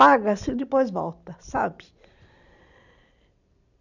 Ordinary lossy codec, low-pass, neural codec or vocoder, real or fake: none; 7.2 kHz; none; real